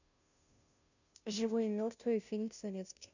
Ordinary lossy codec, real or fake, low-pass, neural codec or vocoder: none; fake; 7.2 kHz; codec, 16 kHz, 0.5 kbps, FunCodec, trained on Chinese and English, 25 frames a second